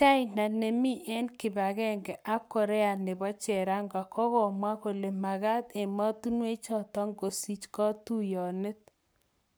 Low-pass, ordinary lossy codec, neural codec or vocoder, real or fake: none; none; codec, 44.1 kHz, 7.8 kbps, Pupu-Codec; fake